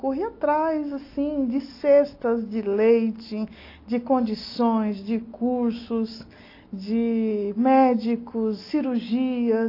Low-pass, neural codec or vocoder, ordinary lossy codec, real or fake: 5.4 kHz; none; AAC, 32 kbps; real